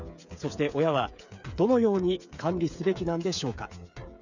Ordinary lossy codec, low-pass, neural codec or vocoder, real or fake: none; 7.2 kHz; codec, 16 kHz, 8 kbps, FreqCodec, smaller model; fake